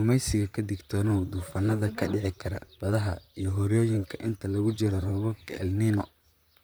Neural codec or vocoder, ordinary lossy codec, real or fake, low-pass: vocoder, 44.1 kHz, 128 mel bands, Pupu-Vocoder; none; fake; none